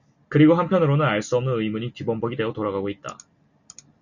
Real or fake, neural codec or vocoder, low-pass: real; none; 7.2 kHz